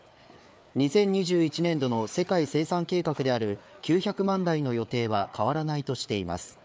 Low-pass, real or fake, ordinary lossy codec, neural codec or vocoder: none; fake; none; codec, 16 kHz, 4 kbps, FreqCodec, larger model